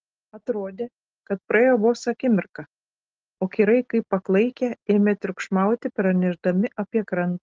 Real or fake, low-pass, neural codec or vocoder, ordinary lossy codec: real; 7.2 kHz; none; Opus, 16 kbps